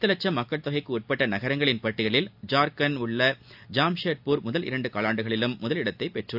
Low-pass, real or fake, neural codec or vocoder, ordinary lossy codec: 5.4 kHz; real; none; AAC, 48 kbps